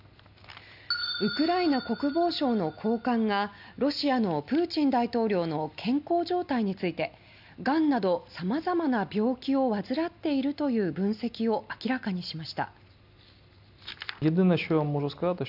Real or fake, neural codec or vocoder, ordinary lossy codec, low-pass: real; none; none; 5.4 kHz